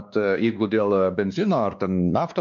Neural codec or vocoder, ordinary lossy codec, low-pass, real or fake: codec, 16 kHz, 2 kbps, X-Codec, WavLM features, trained on Multilingual LibriSpeech; MP3, 96 kbps; 7.2 kHz; fake